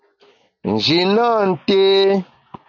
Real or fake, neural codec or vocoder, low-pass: real; none; 7.2 kHz